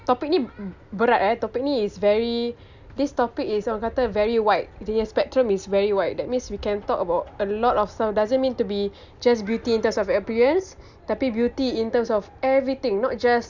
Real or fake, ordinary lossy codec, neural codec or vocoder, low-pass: real; none; none; 7.2 kHz